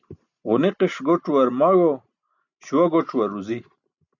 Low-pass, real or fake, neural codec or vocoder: 7.2 kHz; real; none